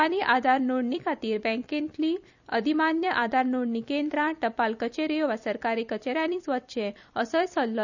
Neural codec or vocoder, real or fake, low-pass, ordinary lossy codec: vocoder, 44.1 kHz, 128 mel bands every 256 samples, BigVGAN v2; fake; 7.2 kHz; none